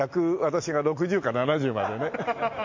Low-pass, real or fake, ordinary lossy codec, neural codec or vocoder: 7.2 kHz; real; MP3, 48 kbps; none